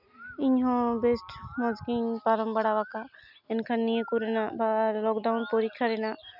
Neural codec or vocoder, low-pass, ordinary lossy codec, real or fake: none; 5.4 kHz; none; real